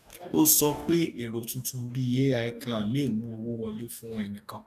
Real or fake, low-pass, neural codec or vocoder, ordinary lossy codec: fake; 14.4 kHz; codec, 44.1 kHz, 2.6 kbps, DAC; none